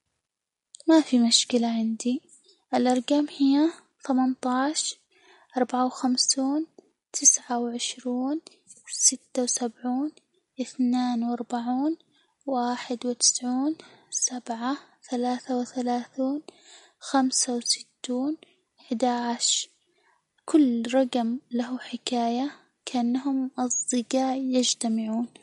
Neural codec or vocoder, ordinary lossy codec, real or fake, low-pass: none; MP3, 48 kbps; real; 19.8 kHz